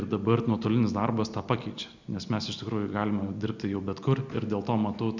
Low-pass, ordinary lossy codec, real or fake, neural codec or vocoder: 7.2 kHz; Opus, 64 kbps; real; none